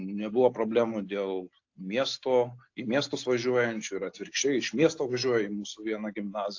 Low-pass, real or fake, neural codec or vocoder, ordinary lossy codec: 7.2 kHz; real; none; AAC, 48 kbps